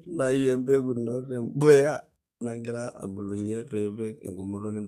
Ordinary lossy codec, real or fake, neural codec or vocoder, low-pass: none; fake; codec, 32 kHz, 1.9 kbps, SNAC; 14.4 kHz